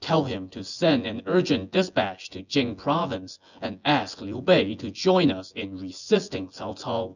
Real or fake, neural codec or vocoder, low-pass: fake; vocoder, 24 kHz, 100 mel bands, Vocos; 7.2 kHz